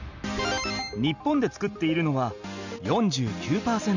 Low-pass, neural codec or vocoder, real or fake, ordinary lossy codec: 7.2 kHz; none; real; none